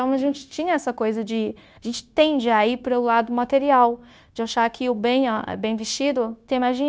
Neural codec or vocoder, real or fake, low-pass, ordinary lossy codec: codec, 16 kHz, 0.9 kbps, LongCat-Audio-Codec; fake; none; none